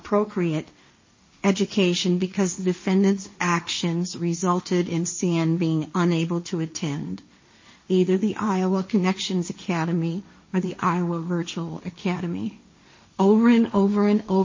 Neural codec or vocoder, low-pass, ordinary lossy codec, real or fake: codec, 16 kHz, 1.1 kbps, Voila-Tokenizer; 7.2 kHz; MP3, 32 kbps; fake